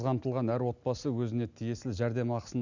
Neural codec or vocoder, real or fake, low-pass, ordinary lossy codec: none; real; 7.2 kHz; none